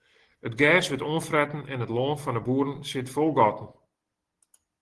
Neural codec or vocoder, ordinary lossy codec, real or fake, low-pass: none; Opus, 16 kbps; real; 10.8 kHz